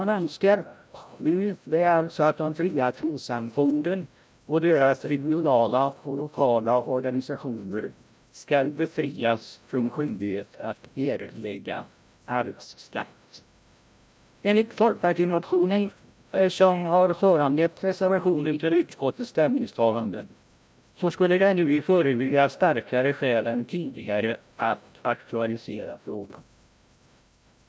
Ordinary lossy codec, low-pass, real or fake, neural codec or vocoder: none; none; fake; codec, 16 kHz, 0.5 kbps, FreqCodec, larger model